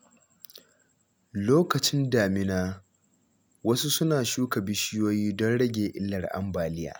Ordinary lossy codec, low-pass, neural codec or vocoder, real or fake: none; none; none; real